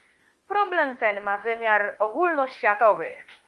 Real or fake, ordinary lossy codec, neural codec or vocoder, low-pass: fake; Opus, 24 kbps; autoencoder, 48 kHz, 32 numbers a frame, DAC-VAE, trained on Japanese speech; 10.8 kHz